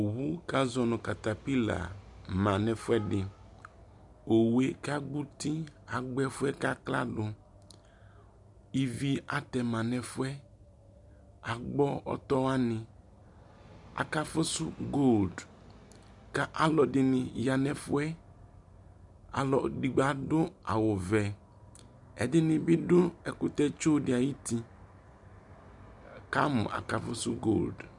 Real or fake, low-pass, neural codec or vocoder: real; 10.8 kHz; none